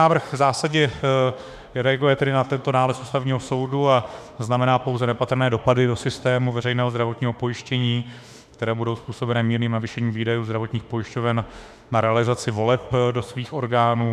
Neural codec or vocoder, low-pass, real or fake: autoencoder, 48 kHz, 32 numbers a frame, DAC-VAE, trained on Japanese speech; 14.4 kHz; fake